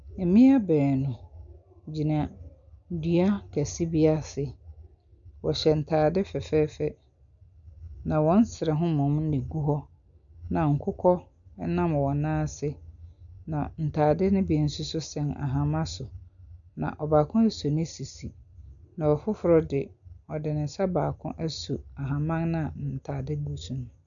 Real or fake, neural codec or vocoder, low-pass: real; none; 7.2 kHz